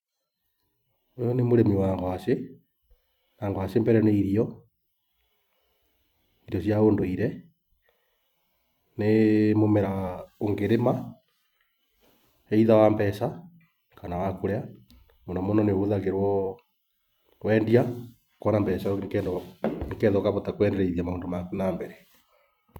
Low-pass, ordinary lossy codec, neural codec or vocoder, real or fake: 19.8 kHz; none; vocoder, 44.1 kHz, 128 mel bands every 256 samples, BigVGAN v2; fake